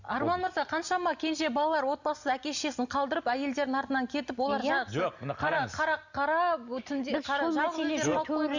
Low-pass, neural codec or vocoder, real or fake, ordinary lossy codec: 7.2 kHz; none; real; none